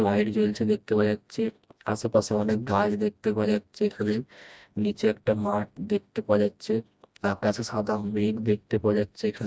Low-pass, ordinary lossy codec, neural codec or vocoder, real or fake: none; none; codec, 16 kHz, 1 kbps, FreqCodec, smaller model; fake